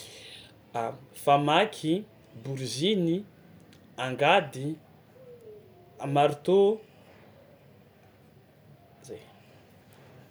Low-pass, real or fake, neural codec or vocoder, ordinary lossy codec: none; real; none; none